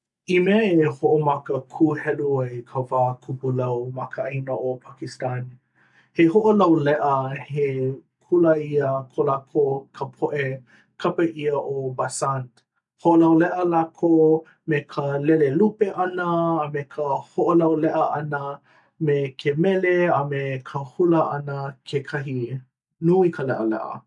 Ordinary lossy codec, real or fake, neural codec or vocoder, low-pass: none; real; none; 10.8 kHz